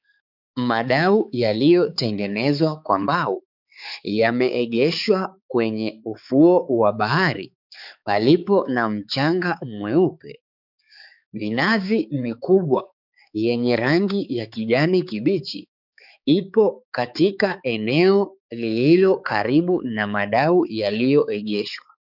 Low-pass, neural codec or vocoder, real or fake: 5.4 kHz; codec, 16 kHz, 4 kbps, X-Codec, HuBERT features, trained on balanced general audio; fake